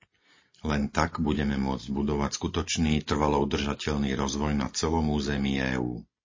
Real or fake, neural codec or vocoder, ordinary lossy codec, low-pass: real; none; MP3, 32 kbps; 7.2 kHz